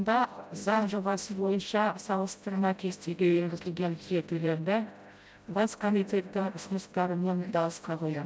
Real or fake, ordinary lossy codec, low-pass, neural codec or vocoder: fake; none; none; codec, 16 kHz, 0.5 kbps, FreqCodec, smaller model